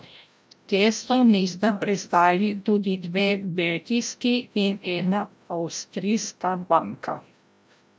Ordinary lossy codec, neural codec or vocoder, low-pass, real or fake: none; codec, 16 kHz, 0.5 kbps, FreqCodec, larger model; none; fake